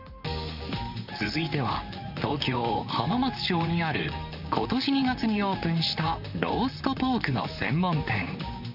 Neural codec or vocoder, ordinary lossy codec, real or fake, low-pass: vocoder, 44.1 kHz, 128 mel bands, Pupu-Vocoder; none; fake; 5.4 kHz